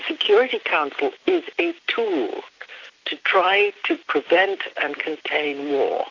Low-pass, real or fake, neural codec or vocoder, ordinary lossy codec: 7.2 kHz; real; none; AAC, 48 kbps